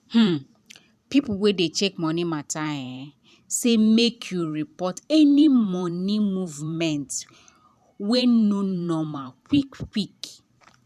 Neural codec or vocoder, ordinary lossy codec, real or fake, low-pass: vocoder, 44.1 kHz, 128 mel bands every 512 samples, BigVGAN v2; none; fake; 14.4 kHz